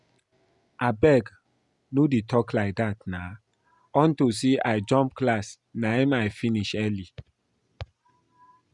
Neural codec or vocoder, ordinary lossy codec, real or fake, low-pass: none; Opus, 64 kbps; real; 10.8 kHz